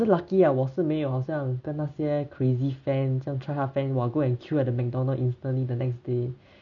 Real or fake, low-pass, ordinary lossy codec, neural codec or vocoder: real; 7.2 kHz; none; none